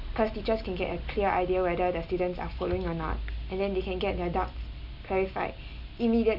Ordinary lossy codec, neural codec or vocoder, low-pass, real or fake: none; none; 5.4 kHz; real